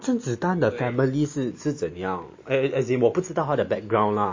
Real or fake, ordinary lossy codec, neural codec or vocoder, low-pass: real; MP3, 32 kbps; none; 7.2 kHz